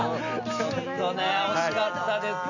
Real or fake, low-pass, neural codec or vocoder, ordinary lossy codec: real; 7.2 kHz; none; none